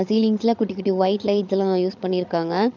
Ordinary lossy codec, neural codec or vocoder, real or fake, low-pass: none; codec, 16 kHz, 8 kbps, FreqCodec, larger model; fake; 7.2 kHz